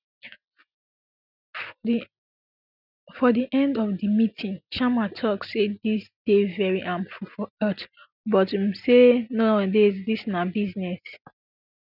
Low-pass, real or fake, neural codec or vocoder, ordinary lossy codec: 5.4 kHz; real; none; none